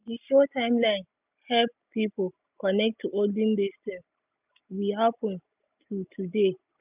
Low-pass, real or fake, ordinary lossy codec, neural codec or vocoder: 3.6 kHz; real; none; none